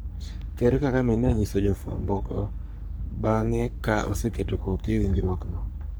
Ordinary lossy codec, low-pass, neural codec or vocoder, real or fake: none; none; codec, 44.1 kHz, 3.4 kbps, Pupu-Codec; fake